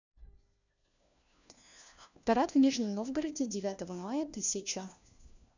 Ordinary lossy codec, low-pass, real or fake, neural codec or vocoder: none; 7.2 kHz; fake; codec, 16 kHz, 1 kbps, FunCodec, trained on LibriTTS, 50 frames a second